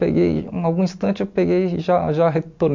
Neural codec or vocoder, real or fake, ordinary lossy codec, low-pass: none; real; MP3, 64 kbps; 7.2 kHz